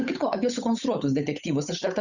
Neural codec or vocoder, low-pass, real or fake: codec, 16 kHz, 8 kbps, FunCodec, trained on Chinese and English, 25 frames a second; 7.2 kHz; fake